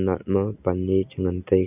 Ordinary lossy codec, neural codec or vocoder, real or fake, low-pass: none; none; real; 3.6 kHz